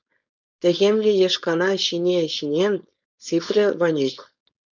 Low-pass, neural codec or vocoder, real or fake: 7.2 kHz; codec, 16 kHz, 4.8 kbps, FACodec; fake